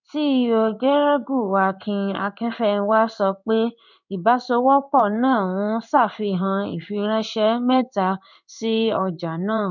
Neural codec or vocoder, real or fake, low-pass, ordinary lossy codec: codec, 16 kHz in and 24 kHz out, 1 kbps, XY-Tokenizer; fake; 7.2 kHz; none